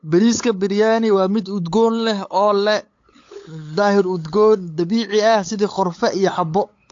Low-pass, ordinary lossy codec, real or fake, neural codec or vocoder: 7.2 kHz; AAC, 48 kbps; fake; codec, 16 kHz, 8 kbps, FunCodec, trained on LibriTTS, 25 frames a second